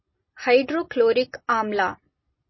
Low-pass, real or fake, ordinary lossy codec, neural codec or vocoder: 7.2 kHz; real; MP3, 24 kbps; none